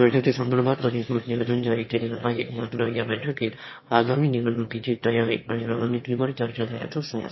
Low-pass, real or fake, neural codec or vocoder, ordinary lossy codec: 7.2 kHz; fake; autoencoder, 22.05 kHz, a latent of 192 numbers a frame, VITS, trained on one speaker; MP3, 24 kbps